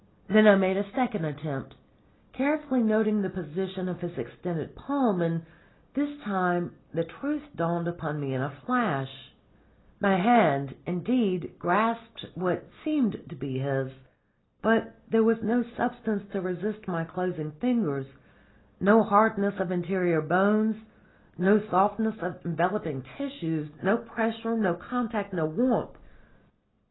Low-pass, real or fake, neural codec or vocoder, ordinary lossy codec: 7.2 kHz; real; none; AAC, 16 kbps